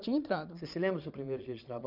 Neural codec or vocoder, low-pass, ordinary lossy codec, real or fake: vocoder, 22.05 kHz, 80 mel bands, Vocos; 5.4 kHz; none; fake